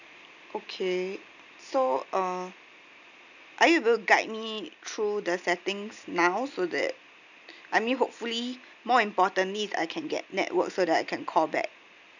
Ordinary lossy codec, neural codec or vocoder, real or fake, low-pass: none; none; real; 7.2 kHz